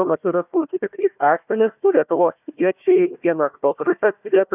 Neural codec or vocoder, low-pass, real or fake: codec, 16 kHz, 1 kbps, FunCodec, trained on Chinese and English, 50 frames a second; 3.6 kHz; fake